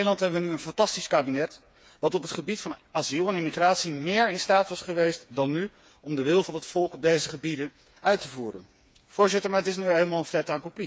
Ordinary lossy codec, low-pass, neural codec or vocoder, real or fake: none; none; codec, 16 kHz, 4 kbps, FreqCodec, smaller model; fake